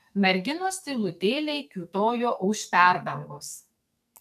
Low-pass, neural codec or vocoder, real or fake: 14.4 kHz; codec, 44.1 kHz, 2.6 kbps, SNAC; fake